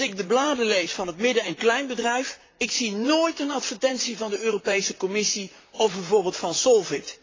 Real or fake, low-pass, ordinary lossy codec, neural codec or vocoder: fake; 7.2 kHz; AAC, 32 kbps; vocoder, 44.1 kHz, 128 mel bands, Pupu-Vocoder